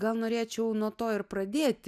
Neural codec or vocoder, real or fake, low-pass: none; real; 14.4 kHz